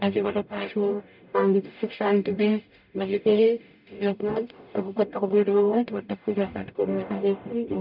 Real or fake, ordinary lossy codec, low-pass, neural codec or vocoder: fake; none; 5.4 kHz; codec, 44.1 kHz, 0.9 kbps, DAC